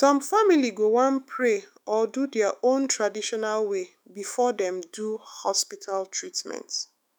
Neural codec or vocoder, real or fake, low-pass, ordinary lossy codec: autoencoder, 48 kHz, 128 numbers a frame, DAC-VAE, trained on Japanese speech; fake; none; none